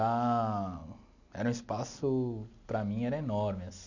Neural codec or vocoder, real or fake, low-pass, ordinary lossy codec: none; real; 7.2 kHz; none